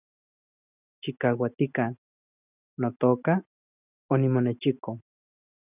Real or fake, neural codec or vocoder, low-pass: real; none; 3.6 kHz